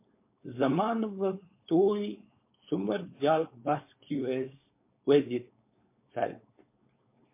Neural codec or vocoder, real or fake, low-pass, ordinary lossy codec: codec, 16 kHz, 4.8 kbps, FACodec; fake; 3.6 kHz; MP3, 24 kbps